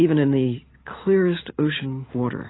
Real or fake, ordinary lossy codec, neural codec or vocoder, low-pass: real; AAC, 16 kbps; none; 7.2 kHz